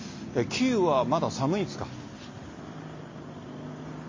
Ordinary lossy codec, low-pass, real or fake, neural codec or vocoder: MP3, 32 kbps; 7.2 kHz; real; none